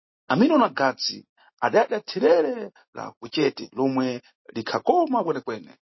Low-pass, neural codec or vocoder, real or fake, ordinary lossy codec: 7.2 kHz; none; real; MP3, 24 kbps